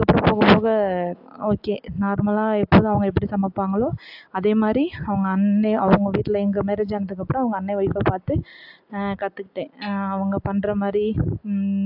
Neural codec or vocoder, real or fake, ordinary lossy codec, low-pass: none; real; AAC, 48 kbps; 5.4 kHz